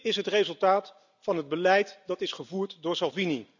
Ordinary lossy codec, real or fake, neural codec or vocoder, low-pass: none; real; none; 7.2 kHz